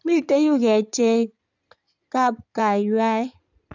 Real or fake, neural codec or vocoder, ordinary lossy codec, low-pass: fake; codec, 16 kHz in and 24 kHz out, 2.2 kbps, FireRedTTS-2 codec; none; 7.2 kHz